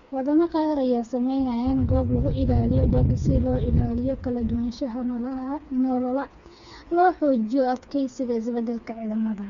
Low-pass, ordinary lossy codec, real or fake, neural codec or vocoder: 7.2 kHz; Opus, 64 kbps; fake; codec, 16 kHz, 4 kbps, FreqCodec, smaller model